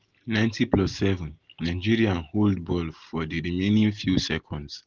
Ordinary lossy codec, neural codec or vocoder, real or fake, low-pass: Opus, 16 kbps; none; real; 7.2 kHz